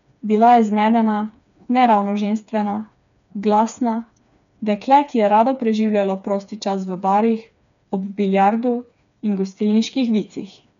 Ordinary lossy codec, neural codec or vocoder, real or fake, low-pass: none; codec, 16 kHz, 4 kbps, FreqCodec, smaller model; fake; 7.2 kHz